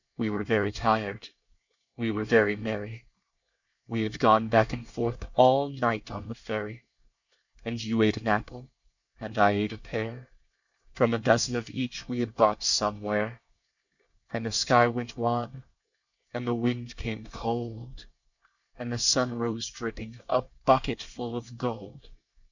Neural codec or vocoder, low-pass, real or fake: codec, 24 kHz, 1 kbps, SNAC; 7.2 kHz; fake